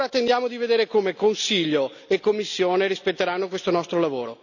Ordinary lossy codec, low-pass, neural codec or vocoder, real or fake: none; 7.2 kHz; none; real